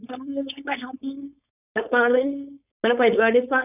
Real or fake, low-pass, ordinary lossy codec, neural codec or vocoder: fake; 3.6 kHz; none; codec, 16 kHz, 4.8 kbps, FACodec